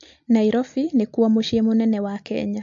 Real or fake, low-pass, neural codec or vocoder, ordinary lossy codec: real; 7.2 kHz; none; MP3, 48 kbps